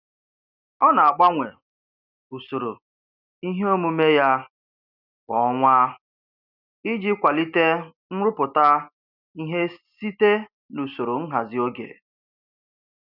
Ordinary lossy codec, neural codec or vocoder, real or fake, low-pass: none; none; real; 5.4 kHz